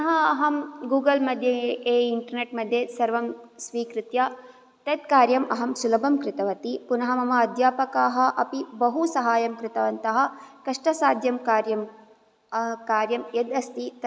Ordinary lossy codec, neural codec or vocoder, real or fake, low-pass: none; none; real; none